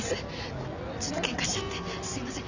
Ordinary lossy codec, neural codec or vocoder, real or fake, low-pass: Opus, 64 kbps; none; real; 7.2 kHz